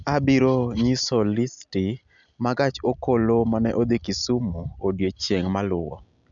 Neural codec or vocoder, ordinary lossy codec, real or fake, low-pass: none; none; real; 7.2 kHz